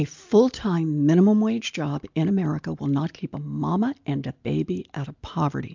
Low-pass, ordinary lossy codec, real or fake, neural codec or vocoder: 7.2 kHz; MP3, 64 kbps; real; none